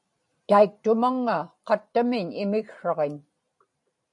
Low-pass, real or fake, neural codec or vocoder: 10.8 kHz; fake; vocoder, 44.1 kHz, 128 mel bands every 512 samples, BigVGAN v2